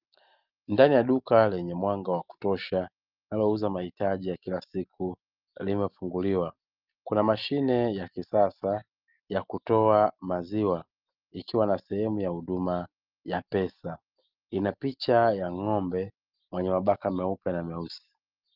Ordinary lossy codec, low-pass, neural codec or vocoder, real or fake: Opus, 32 kbps; 5.4 kHz; none; real